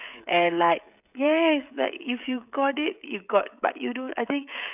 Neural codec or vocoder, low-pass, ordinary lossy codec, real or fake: codec, 16 kHz, 16 kbps, FreqCodec, smaller model; 3.6 kHz; none; fake